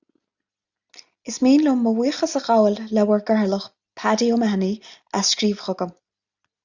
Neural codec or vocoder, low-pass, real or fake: none; 7.2 kHz; real